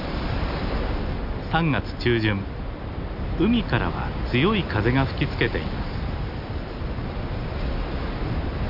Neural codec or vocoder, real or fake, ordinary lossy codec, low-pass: none; real; none; 5.4 kHz